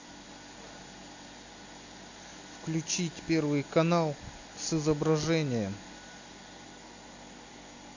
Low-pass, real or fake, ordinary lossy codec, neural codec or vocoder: 7.2 kHz; real; AAC, 48 kbps; none